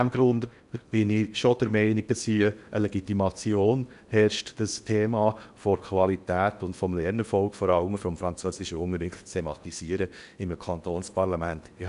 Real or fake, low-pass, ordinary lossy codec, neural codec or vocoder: fake; 10.8 kHz; none; codec, 16 kHz in and 24 kHz out, 0.8 kbps, FocalCodec, streaming, 65536 codes